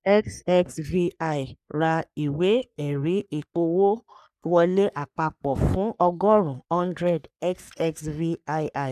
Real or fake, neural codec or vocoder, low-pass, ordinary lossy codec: fake; codec, 44.1 kHz, 3.4 kbps, Pupu-Codec; 14.4 kHz; none